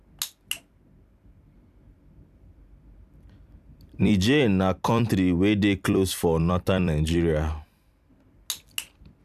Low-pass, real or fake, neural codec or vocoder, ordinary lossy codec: 14.4 kHz; fake; vocoder, 44.1 kHz, 128 mel bands every 256 samples, BigVGAN v2; AAC, 96 kbps